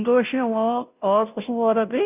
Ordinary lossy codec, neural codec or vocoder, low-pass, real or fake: none; codec, 16 kHz, 0.5 kbps, FunCodec, trained on Chinese and English, 25 frames a second; 3.6 kHz; fake